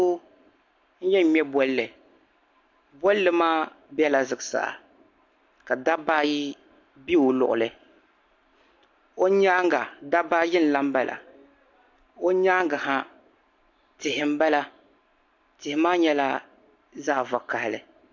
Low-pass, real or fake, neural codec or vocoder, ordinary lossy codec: 7.2 kHz; real; none; AAC, 48 kbps